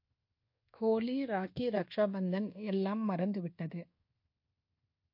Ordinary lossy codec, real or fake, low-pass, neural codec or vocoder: MP3, 32 kbps; fake; 5.4 kHz; codec, 24 kHz, 1.2 kbps, DualCodec